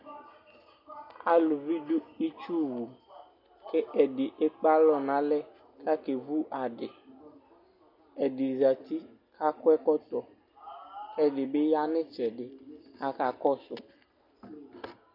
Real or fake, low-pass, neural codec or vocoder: real; 5.4 kHz; none